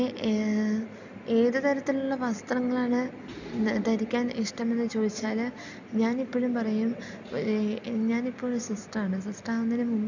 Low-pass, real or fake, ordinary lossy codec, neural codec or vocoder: 7.2 kHz; real; none; none